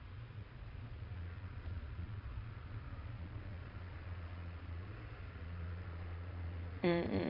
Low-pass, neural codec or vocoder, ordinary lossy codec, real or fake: 5.4 kHz; vocoder, 22.05 kHz, 80 mel bands, Vocos; AAC, 32 kbps; fake